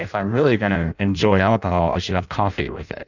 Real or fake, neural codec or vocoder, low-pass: fake; codec, 16 kHz in and 24 kHz out, 0.6 kbps, FireRedTTS-2 codec; 7.2 kHz